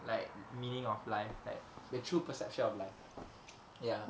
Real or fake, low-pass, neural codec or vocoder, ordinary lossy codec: real; none; none; none